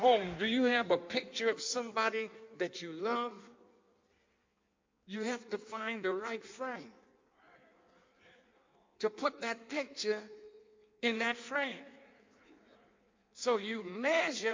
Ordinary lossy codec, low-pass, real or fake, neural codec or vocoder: MP3, 48 kbps; 7.2 kHz; fake; codec, 16 kHz in and 24 kHz out, 1.1 kbps, FireRedTTS-2 codec